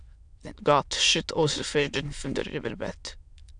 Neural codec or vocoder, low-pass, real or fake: autoencoder, 22.05 kHz, a latent of 192 numbers a frame, VITS, trained on many speakers; 9.9 kHz; fake